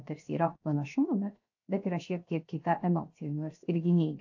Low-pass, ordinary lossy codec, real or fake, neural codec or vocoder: 7.2 kHz; AAC, 48 kbps; fake; codec, 16 kHz, 0.7 kbps, FocalCodec